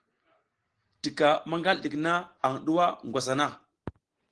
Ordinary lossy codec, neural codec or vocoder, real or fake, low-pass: Opus, 16 kbps; none; real; 9.9 kHz